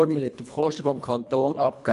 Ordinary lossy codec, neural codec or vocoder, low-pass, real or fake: none; codec, 24 kHz, 1.5 kbps, HILCodec; 10.8 kHz; fake